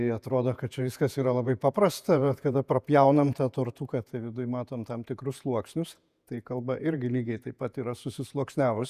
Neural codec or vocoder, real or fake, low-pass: vocoder, 48 kHz, 128 mel bands, Vocos; fake; 14.4 kHz